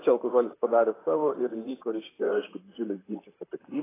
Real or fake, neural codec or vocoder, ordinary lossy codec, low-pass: fake; vocoder, 44.1 kHz, 128 mel bands, Pupu-Vocoder; AAC, 16 kbps; 3.6 kHz